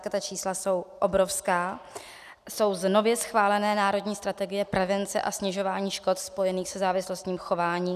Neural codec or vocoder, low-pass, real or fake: none; 14.4 kHz; real